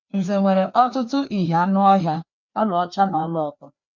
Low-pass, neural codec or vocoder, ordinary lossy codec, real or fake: 7.2 kHz; codec, 16 kHz, 2 kbps, FreqCodec, larger model; none; fake